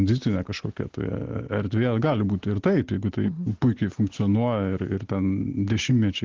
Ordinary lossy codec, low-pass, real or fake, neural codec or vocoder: Opus, 16 kbps; 7.2 kHz; real; none